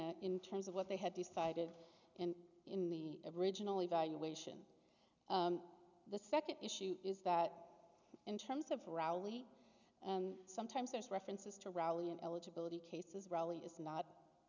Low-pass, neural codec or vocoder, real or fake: 7.2 kHz; none; real